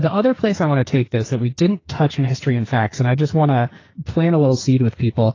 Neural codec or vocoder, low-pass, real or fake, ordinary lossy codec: codec, 44.1 kHz, 2.6 kbps, SNAC; 7.2 kHz; fake; AAC, 32 kbps